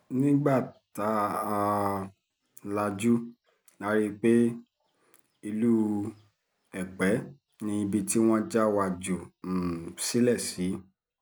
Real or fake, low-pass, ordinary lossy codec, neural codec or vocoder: real; none; none; none